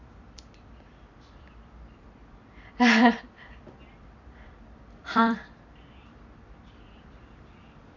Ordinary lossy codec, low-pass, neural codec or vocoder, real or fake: none; 7.2 kHz; vocoder, 44.1 kHz, 128 mel bands every 256 samples, BigVGAN v2; fake